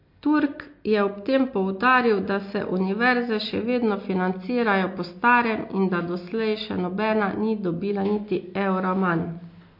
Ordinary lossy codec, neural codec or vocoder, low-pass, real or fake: MP3, 32 kbps; none; 5.4 kHz; real